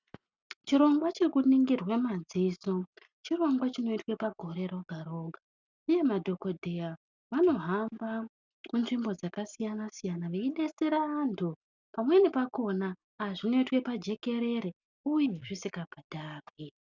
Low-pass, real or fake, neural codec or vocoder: 7.2 kHz; real; none